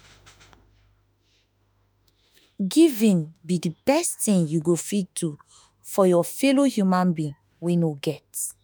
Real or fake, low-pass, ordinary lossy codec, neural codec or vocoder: fake; none; none; autoencoder, 48 kHz, 32 numbers a frame, DAC-VAE, trained on Japanese speech